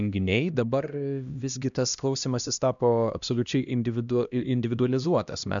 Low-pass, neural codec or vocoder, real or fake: 7.2 kHz; codec, 16 kHz, 1 kbps, X-Codec, HuBERT features, trained on LibriSpeech; fake